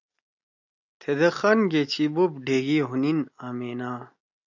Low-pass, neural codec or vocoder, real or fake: 7.2 kHz; none; real